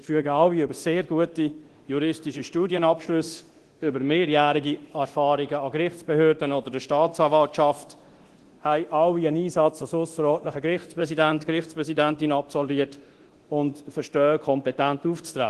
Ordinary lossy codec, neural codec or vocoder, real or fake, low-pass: Opus, 16 kbps; codec, 24 kHz, 0.9 kbps, DualCodec; fake; 10.8 kHz